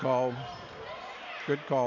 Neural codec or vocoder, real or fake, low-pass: none; real; 7.2 kHz